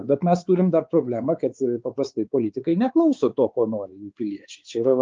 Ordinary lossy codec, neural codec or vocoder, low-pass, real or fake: Opus, 24 kbps; codec, 16 kHz, 4 kbps, X-Codec, WavLM features, trained on Multilingual LibriSpeech; 7.2 kHz; fake